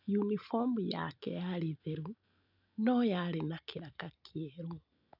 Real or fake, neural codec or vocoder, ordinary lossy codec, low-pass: real; none; none; 5.4 kHz